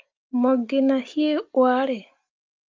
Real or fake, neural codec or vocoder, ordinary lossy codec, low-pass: real; none; Opus, 32 kbps; 7.2 kHz